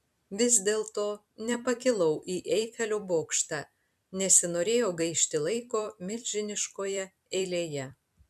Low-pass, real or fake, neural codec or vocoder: 14.4 kHz; fake; vocoder, 44.1 kHz, 128 mel bands every 256 samples, BigVGAN v2